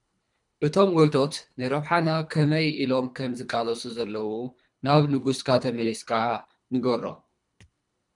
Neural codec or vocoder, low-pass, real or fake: codec, 24 kHz, 3 kbps, HILCodec; 10.8 kHz; fake